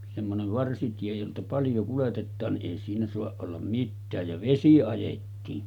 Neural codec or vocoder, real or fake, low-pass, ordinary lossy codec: none; real; 19.8 kHz; none